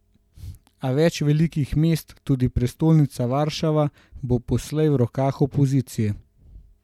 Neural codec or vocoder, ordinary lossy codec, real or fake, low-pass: none; MP3, 96 kbps; real; 19.8 kHz